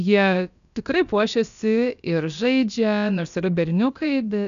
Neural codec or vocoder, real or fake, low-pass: codec, 16 kHz, about 1 kbps, DyCAST, with the encoder's durations; fake; 7.2 kHz